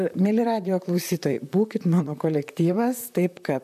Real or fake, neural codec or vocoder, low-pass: fake; vocoder, 44.1 kHz, 128 mel bands, Pupu-Vocoder; 14.4 kHz